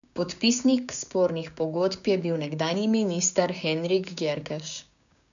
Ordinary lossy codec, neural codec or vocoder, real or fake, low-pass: none; codec, 16 kHz, 6 kbps, DAC; fake; 7.2 kHz